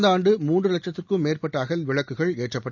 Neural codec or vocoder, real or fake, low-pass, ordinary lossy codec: none; real; 7.2 kHz; none